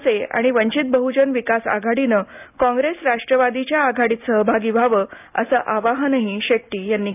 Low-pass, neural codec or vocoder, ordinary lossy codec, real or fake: 3.6 kHz; none; AAC, 32 kbps; real